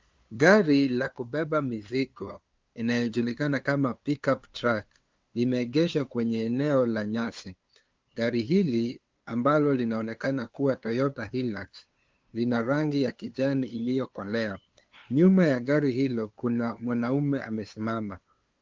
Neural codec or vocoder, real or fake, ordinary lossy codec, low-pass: codec, 16 kHz, 2 kbps, FunCodec, trained on LibriTTS, 25 frames a second; fake; Opus, 32 kbps; 7.2 kHz